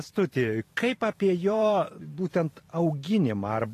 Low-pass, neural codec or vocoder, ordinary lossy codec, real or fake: 14.4 kHz; vocoder, 44.1 kHz, 128 mel bands every 512 samples, BigVGAN v2; AAC, 48 kbps; fake